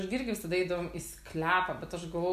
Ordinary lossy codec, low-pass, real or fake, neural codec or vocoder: AAC, 96 kbps; 14.4 kHz; real; none